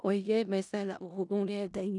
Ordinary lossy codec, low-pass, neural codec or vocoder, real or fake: none; 10.8 kHz; codec, 16 kHz in and 24 kHz out, 0.4 kbps, LongCat-Audio-Codec, four codebook decoder; fake